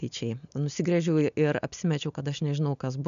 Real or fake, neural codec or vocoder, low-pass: real; none; 7.2 kHz